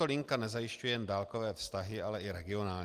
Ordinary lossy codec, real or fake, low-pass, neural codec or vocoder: Opus, 24 kbps; real; 14.4 kHz; none